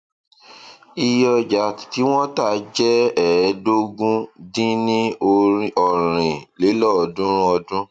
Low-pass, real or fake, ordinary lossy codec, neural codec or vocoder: 9.9 kHz; real; none; none